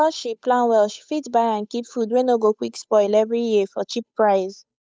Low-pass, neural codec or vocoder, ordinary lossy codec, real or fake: none; codec, 16 kHz, 8 kbps, FunCodec, trained on Chinese and English, 25 frames a second; none; fake